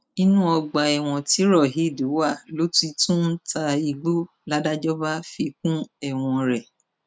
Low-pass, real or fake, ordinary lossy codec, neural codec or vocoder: none; real; none; none